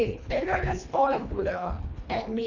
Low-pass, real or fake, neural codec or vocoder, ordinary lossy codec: 7.2 kHz; fake; codec, 24 kHz, 1.5 kbps, HILCodec; none